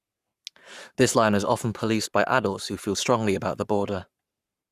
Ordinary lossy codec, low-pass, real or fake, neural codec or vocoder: Opus, 64 kbps; 14.4 kHz; fake; codec, 44.1 kHz, 7.8 kbps, Pupu-Codec